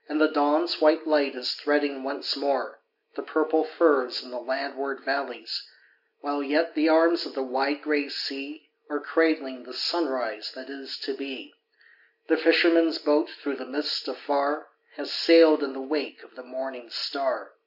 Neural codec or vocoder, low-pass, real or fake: none; 5.4 kHz; real